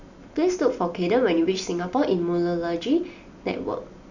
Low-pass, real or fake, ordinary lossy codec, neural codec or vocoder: 7.2 kHz; real; none; none